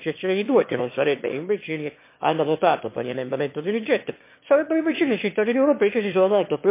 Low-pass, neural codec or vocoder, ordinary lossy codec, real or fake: 3.6 kHz; autoencoder, 22.05 kHz, a latent of 192 numbers a frame, VITS, trained on one speaker; MP3, 24 kbps; fake